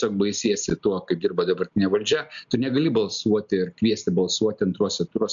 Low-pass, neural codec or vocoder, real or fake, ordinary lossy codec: 7.2 kHz; none; real; MP3, 64 kbps